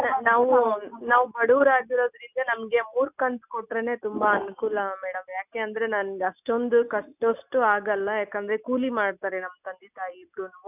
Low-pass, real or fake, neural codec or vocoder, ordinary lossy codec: 3.6 kHz; real; none; none